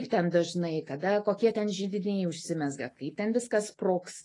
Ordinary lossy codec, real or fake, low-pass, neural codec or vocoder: AAC, 32 kbps; fake; 10.8 kHz; autoencoder, 48 kHz, 128 numbers a frame, DAC-VAE, trained on Japanese speech